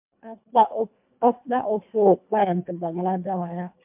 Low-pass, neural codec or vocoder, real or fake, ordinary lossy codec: 3.6 kHz; codec, 24 kHz, 1.5 kbps, HILCodec; fake; MP3, 32 kbps